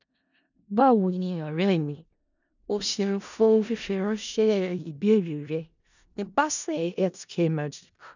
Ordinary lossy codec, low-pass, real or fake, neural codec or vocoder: none; 7.2 kHz; fake; codec, 16 kHz in and 24 kHz out, 0.4 kbps, LongCat-Audio-Codec, four codebook decoder